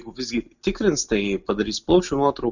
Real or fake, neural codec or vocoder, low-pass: real; none; 7.2 kHz